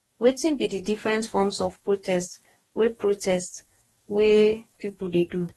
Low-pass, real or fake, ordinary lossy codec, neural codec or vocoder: 19.8 kHz; fake; AAC, 32 kbps; codec, 44.1 kHz, 2.6 kbps, DAC